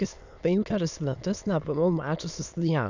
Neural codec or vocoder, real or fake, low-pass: autoencoder, 22.05 kHz, a latent of 192 numbers a frame, VITS, trained on many speakers; fake; 7.2 kHz